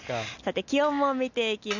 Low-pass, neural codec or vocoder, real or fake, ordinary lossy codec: 7.2 kHz; none; real; none